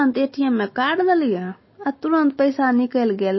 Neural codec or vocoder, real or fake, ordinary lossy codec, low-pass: none; real; MP3, 24 kbps; 7.2 kHz